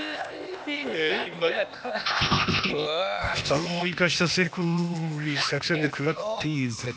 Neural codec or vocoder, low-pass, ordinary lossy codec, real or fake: codec, 16 kHz, 0.8 kbps, ZipCodec; none; none; fake